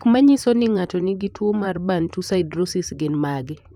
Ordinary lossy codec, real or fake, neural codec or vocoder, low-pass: none; fake; vocoder, 44.1 kHz, 128 mel bands, Pupu-Vocoder; 19.8 kHz